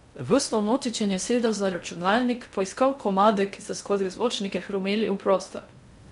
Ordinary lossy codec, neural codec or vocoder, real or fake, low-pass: MP3, 64 kbps; codec, 16 kHz in and 24 kHz out, 0.6 kbps, FocalCodec, streaming, 2048 codes; fake; 10.8 kHz